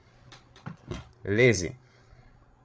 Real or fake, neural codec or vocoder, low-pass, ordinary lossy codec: fake; codec, 16 kHz, 16 kbps, FreqCodec, larger model; none; none